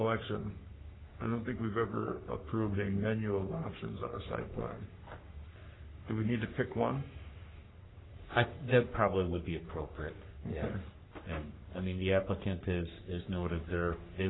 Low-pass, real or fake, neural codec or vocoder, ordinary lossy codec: 7.2 kHz; fake; codec, 44.1 kHz, 3.4 kbps, Pupu-Codec; AAC, 16 kbps